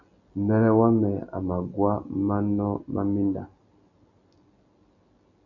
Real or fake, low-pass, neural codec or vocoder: real; 7.2 kHz; none